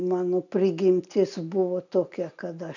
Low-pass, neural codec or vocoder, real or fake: 7.2 kHz; none; real